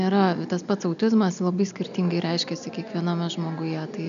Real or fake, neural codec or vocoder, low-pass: real; none; 7.2 kHz